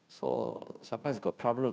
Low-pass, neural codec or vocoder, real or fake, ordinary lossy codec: none; codec, 16 kHz, 0.5 kbps, FunCodec, trained on Chinese and English, 25 frames a second; fake; none